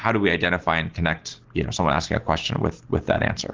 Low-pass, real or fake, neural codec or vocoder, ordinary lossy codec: 7.2 kHz; real; none; Opus, 16 kbps